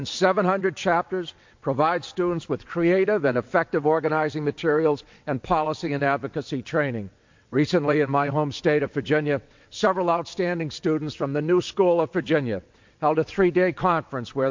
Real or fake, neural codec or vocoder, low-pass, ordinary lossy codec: fake; vocoder, 22.05 kHz, 80 mel bands, WaveNeXt; 7.2 kHz; MP3, 48 kbps